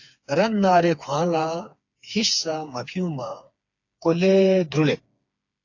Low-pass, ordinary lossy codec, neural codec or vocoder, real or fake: 7.2 kHz; AAC, 48 kbps; codec, 16 kHz, 4 kbps, FreqCodec, smaller model; fake